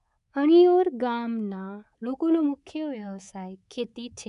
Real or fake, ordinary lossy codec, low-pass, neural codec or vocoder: fake; none; 10.8 kHz; codec, 24 kHz, 3.1 kbps, DualCodec